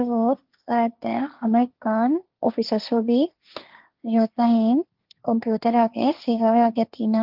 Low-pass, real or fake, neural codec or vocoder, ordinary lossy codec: 5.4 kHz; fake; codec, 16 kHz, 1.1 kbps, Voila-Tokenizer; Opus, 32 kbps